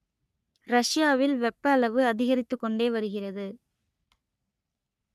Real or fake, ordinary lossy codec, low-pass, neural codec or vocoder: fake; none; 14.4 kHz; codec, 44.1 kHz, 3.4 kbps, Pupu-Codec